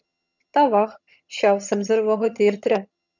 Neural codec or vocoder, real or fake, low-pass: vocoder, 22.05 kHz, 80 mel bands, HiFi-GAN; fake; 7.2 kHz